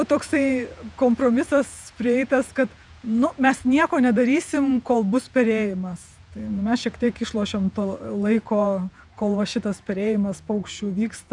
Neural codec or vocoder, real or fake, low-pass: vocoder, 48 kHz, 128 mel bands, Vocos; fake; 10.8 kHz